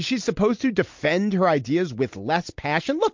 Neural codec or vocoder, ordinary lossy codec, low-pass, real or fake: none; MP3, 48 kbps; 7.2 kHz; real